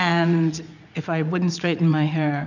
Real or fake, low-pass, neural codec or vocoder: fake; 7.2 kHz; vocoder, 44.1 kHz, 80 mel bands, Vocos